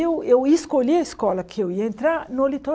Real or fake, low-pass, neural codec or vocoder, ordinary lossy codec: real; none; none; none